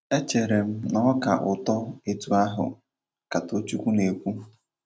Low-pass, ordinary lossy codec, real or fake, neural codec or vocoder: none; none; real; none